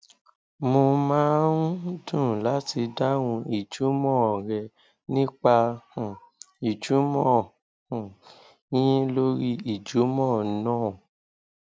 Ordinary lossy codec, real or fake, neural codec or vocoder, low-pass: none; real; none; none